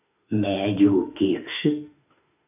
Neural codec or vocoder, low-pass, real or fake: autoencoder, 48 kHz, 32 numbers a frame, DAC-VAE, trained on Japanese speech; 3.6 kHz; fake